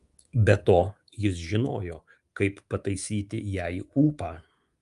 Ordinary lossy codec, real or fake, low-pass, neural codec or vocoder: Opus, 32 kbps; fake; 10.8 kHz; codec, 24 kHz, 3.1 kbps, DualCodec